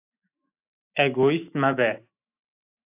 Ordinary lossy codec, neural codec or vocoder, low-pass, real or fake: AAC, 32 kbps; autoencoder, 48 kHz, 128 numbers a frame, DAC-VAE, trained on Japanese speech; 3.6 kHz; fake